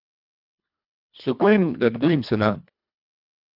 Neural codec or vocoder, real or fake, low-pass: codec, 24 kHz, 1.5 kbps, HILCodec; fake; 5.4 kHz